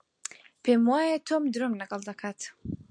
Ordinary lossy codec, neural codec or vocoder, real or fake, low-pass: MP3, 64 kbps; none; real; 9.9 kHz